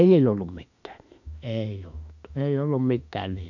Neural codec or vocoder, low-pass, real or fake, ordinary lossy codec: autoencoder, 48 kHz, 32 numbers a frame, DAC-VAE, trained on Japanese speech; 7.2 kHz; fake; none